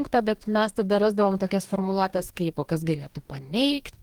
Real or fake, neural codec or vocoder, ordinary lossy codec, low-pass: fake; codec, 44.1 kHz, 2.6 kbps, DAC; Opus, 24 kbps; 19.8 kHz